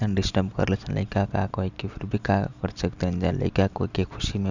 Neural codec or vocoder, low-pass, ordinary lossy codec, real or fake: none; 7.2 kHz; none; real